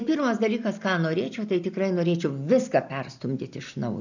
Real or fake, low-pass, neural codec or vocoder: real; 7.2 kHz; none